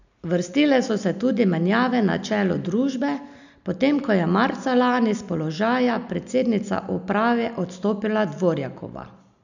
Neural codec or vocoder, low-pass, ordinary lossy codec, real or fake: none; 7.2 kHz; none; real